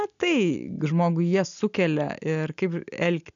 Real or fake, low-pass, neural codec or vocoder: real; 7.2 kHz; none